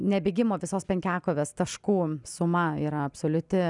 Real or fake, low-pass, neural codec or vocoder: real; 10.8 kHz; none